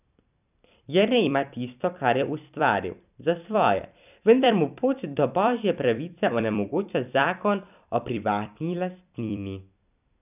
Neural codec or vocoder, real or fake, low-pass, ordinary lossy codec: vocoder, 44.1 kHz, 128 mel bands every 256 samples, BigVGAN v2; fake; 3.6 kHz; none